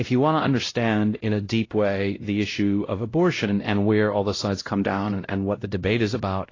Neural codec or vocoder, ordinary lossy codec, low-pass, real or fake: codec, 16 kHz, 0.5 kbps, X-Codec, WavLM features, trained on Multilingual LibriSpeech; AAC, 32 kbps; 7.2 kHz; fake